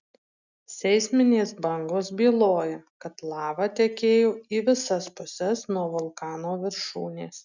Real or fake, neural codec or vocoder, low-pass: real; none; 7.2 kHz